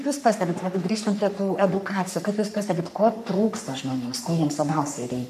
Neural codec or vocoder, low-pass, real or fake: codec, 44.1 kHz, 3.4 kbps, Pupu-Codec; 14.4 kHz; fake